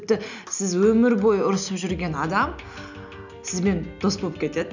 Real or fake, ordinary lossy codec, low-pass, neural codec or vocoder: real; none; 7.2 kHz; none